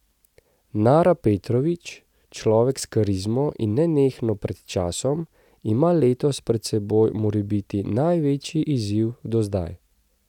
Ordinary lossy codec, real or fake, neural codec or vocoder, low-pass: none; real; none; 19.8 kHz